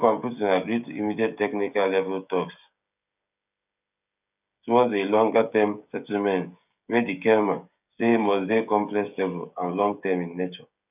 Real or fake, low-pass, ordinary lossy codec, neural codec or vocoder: fake; 3.6 kHz; none; codec, 16 kHz, 16 kbps, FreqCodec, smaller model